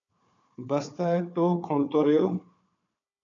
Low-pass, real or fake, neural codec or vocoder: 7.2 kHz; fake; codec, 16 kHz, 4 kbps, FunCodec, trained on Chinese and English, 50 frames a second